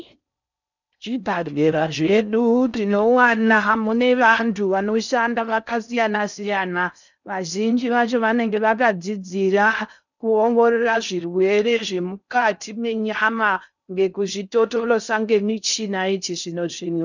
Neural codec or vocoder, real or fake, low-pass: codec, 16 kHz in and 24 kHz out, 0.6 kbps, FocalCodec, streaming, 4096 codes; fake; 7.2 kHz